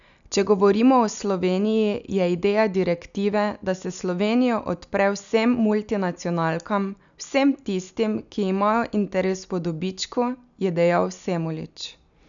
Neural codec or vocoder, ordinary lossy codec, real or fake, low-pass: none; none; real; 7.2 kHz